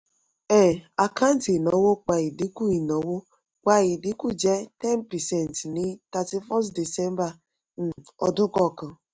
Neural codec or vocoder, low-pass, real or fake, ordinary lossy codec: none; none; real; none